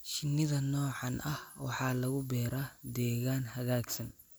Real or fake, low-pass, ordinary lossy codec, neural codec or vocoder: real; none; none; none